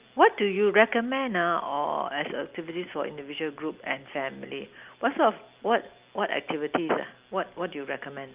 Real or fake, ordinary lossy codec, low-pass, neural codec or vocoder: real; Opus, 24 kbps; 3.6 kHz; none